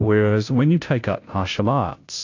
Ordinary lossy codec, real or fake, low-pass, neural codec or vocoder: AAC, 48 kbps; fake; 7.2 kHz; codec, 16 kHz, 0.5 kbps, FunCodec, trained on Chinese and English, 25 frames a second